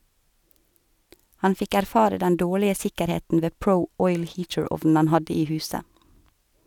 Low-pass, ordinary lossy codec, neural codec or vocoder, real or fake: 19.8 kHz; none; none; real